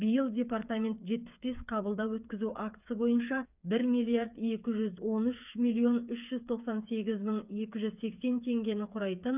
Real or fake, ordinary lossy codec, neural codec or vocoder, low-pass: fake; none; codec, 16 kHz, 8 kbps, FreqCodec, smaller model; 3.6 kHz